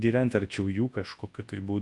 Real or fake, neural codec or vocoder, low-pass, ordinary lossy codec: fake; codec, 24 kHz, 0.9 kbps, WavTokenizer, large speech release; 10.8 kHz; AAC, 48 kbps